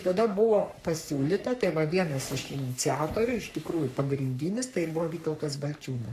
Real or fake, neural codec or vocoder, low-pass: fake; codec, 44.1 kHz, 3.4 kbps, Pupu-Codec; 14.4 kHz